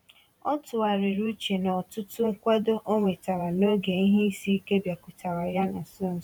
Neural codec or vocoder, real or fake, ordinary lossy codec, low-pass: vocoder, 44.1 kHz, 128 mel bands every 512 samples, BigVGAN v2; fake; none; 19.8 kHz